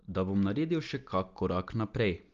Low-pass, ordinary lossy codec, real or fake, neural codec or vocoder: 7.2 kHz; Opus, 32 kbps; real; none